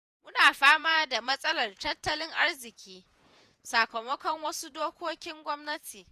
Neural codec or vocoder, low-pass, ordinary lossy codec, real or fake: vocoder, 48 kHz, 128 mel bands, Vocos; 14.4 kHz; none; fake